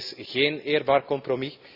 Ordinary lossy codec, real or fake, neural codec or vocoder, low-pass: none; real; none; 5.4 kHz